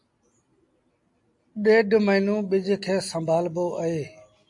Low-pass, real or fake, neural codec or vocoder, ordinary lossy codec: 10.8 kHz; real; none; AAC, 64 kbps